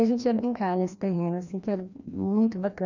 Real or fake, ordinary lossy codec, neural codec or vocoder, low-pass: fake; none; codec, 16 kHz, 1 kbps, FreqCodec, larger model; 7.2 kHz